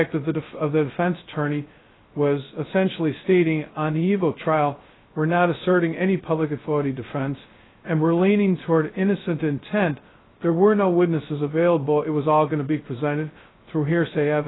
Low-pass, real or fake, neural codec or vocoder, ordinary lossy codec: 7.2 kHz; fake; codec, 16 kHz, 0.2 kbps, FocalCodec; AAC, 16 kbps